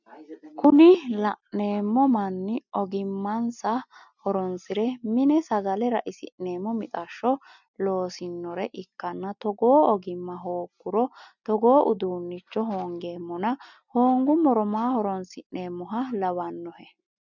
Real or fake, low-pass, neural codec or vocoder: real; 7.2 kHz; none